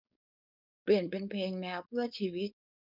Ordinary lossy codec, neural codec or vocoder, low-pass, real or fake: none; codec, 16 kHz, 4.8 kbps, FACodec; 5.4 kHz; fake